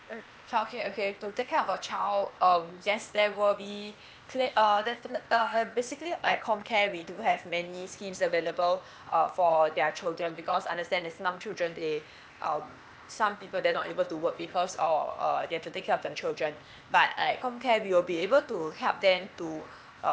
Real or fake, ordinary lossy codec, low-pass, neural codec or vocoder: fake; none; none; codec, 16 kHz, 0.8 kbps, ZipCodec